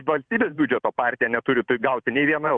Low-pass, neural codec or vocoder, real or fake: 9.9 kHz; vocoder, 44.1 kHz, 128 mel bands every 512 samples, BigVGAN v2; fake